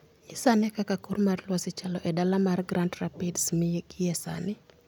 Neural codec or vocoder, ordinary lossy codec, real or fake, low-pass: none; none; real; none